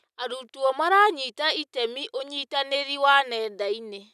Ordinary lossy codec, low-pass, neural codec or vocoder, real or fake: none; 14.4 kHz; none; real